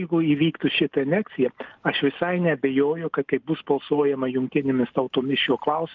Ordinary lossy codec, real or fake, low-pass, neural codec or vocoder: Opus, 32 kbps; real; 7.2 kHz; none